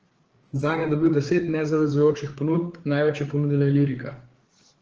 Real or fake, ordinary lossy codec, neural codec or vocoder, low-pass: fake; Opus, 16 kbps; codec, 16 kHz, 4 kbps, FreqCodec, larger model; 7.2 kHz